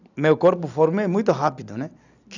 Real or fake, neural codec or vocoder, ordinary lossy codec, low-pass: real; none; none; 7.2 kHz